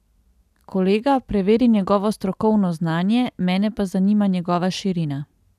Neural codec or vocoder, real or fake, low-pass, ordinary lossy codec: none; real; 14.4 kHz; none